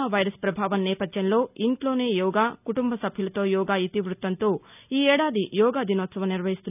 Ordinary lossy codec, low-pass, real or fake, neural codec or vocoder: none; 3.6 kHz; real; none